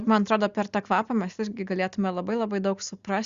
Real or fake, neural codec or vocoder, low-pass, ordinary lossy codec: real; none; 7.2 kHz; Opus, 64 kbps